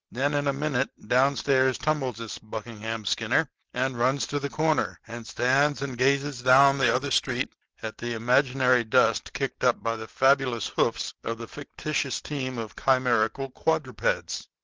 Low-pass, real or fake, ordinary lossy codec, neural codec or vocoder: 7.2 kHz; real; Opus, 16 kbps; none